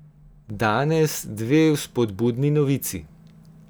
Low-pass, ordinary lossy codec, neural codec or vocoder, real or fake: none; none; none; real